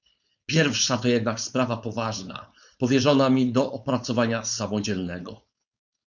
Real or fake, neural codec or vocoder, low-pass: fake; codec, 16 kHz, 4.8 kbps, FACodec; 7.2 kHz